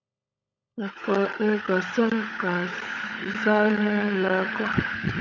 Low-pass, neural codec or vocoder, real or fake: 7.2 kHz; codec, 16 kHz, 16 kbps, FunCodec, trained on LibriTTS, 50 frames a second; fake